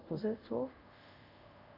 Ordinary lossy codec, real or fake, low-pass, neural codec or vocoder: none; fake; 5.4 kHz; codec, 24 kHz, 0.5 kbps, DualCodec